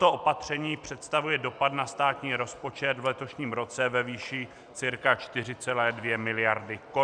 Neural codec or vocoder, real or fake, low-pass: none; real; 9.9 kHz